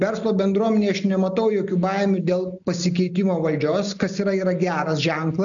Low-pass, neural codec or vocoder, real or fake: 7.2 kHz; none; real